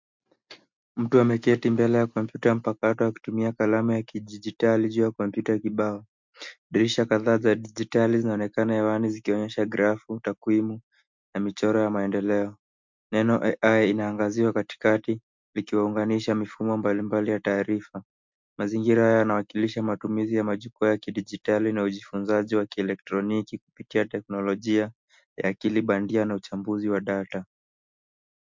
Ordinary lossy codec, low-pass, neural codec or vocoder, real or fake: MP3, 64 kbps; 7.2 kHz; none; real